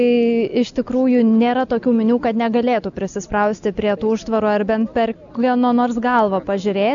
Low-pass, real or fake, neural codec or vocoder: 7.2 kHz; real; none